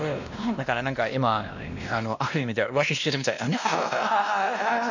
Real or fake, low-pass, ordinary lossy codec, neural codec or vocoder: fake; 7.2 kHz; none; codec, 16 kHz, 1 kbps, X-Codec, WavLM features, trained on Multilingual LibriSpeech